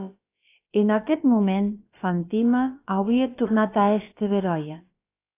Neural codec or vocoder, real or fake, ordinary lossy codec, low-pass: codec, 16 kHz, about 1 kbps, DyCAST, with the encoder's durations; fake; AAC, 24 kbps; 3.6 kHz